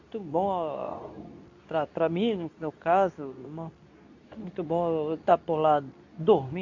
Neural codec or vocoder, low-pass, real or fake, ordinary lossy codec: codec, 24 kHz, 0.9 kbps, WavTokenizer, medium speech release version 2; 7.2 kHz; fake; AAC, 48 kbps